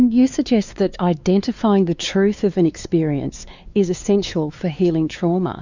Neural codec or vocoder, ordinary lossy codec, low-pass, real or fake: codec, 16 kHz, 4 kbps, X-Codec, WavLM features, trained on Multilingual LibriSpeech; Opus, 64 kbps; 7.2 kHz; fake